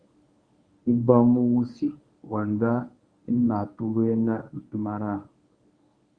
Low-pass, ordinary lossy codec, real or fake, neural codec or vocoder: 9.9 kHz; Opus, 64 kbps; fake; codec, 24 kHz, 0.9 kbps, WavTokenizer, medium speech release version 1